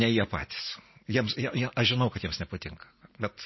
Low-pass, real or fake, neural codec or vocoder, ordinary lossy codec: 7.2 kHz; real; none; MP3, 24 kbps